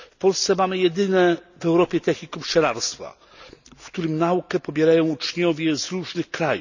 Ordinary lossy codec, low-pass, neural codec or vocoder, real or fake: none; 7.2 kHz; none; real